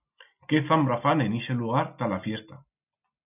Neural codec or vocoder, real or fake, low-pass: none; real; 3.6 kHz